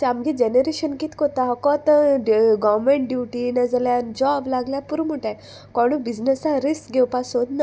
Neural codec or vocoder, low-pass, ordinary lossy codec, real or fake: none; none; none; real